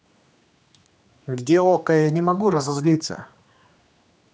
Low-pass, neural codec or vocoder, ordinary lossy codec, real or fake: none; codec, 16 kHz, 2 kbps, X-Codec, HuBERT features, trained on general audio; none; fake